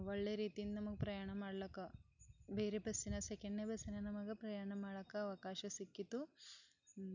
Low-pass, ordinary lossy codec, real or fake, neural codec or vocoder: 7.2 kHz; none; real; none